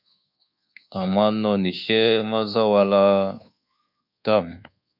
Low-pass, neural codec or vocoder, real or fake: 5.4 kHz; codec, 24 kHz, 1.2 kbps, DualCodec; fake